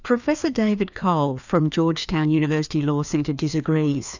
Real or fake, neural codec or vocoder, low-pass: fake; codec, 16 kHz, 2 kbps, FreqCodec, larger model; 7.2 kHz